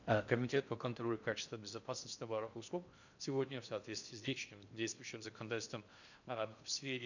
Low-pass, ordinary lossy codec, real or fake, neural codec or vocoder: 7.2 kHz; none; fake; codec, 16 kHz in and 24 kHz out, 0.6 kbps, FocalCodec, streaming, 4096 codes